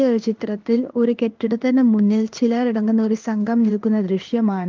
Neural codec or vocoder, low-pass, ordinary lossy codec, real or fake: codec, 16 kHz in and 24 kHz out, 1 kbps, XY-Tokenizer; 7.2 kHz; Opus, 24 kbps; fake